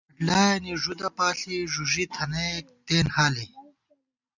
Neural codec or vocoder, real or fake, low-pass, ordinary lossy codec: none; real; 7.2 kHz; Opus, 64 kbps